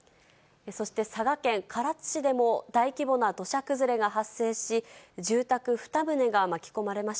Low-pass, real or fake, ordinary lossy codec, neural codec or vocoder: none; real; none; none